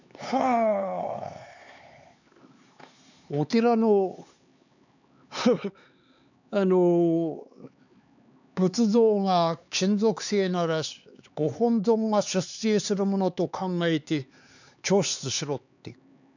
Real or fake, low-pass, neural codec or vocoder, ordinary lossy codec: fake; 7.2 kHz; codec, 16 kHz, 4 kbps, X-Codec, HuBERT features, trained on LibriSpeech; none